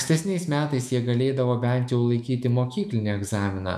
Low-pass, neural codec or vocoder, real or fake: 14.4 kHz; autoencoder, 48 kHz, 128 numbers a frame, DAC-VAE, trained on Japanese speech; fake